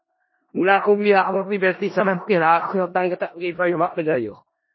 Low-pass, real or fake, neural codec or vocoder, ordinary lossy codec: 7.2 kHz; fake; codec, 16 kHz in and 24 kHz out, 0.4 kbps, LongCat-Audio-Codec, four codebook decoder; MP3, 24 kbps